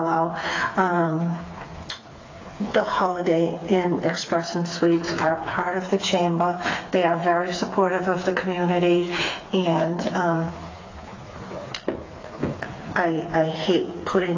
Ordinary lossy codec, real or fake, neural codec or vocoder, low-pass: AAC, 32 kbps; fake; codec, 16 kHz, 4 kbps, FreqCodec, smaller model; 7.2 kHz